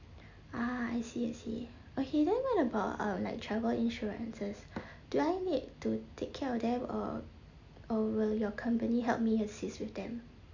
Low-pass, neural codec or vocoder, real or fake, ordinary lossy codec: 7.2 kHz; none; real; none